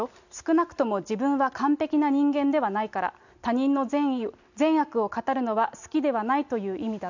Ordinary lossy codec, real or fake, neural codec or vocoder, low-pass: none; real; none; 7.2 kHz